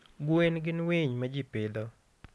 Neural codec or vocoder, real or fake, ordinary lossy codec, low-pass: none; real; none; none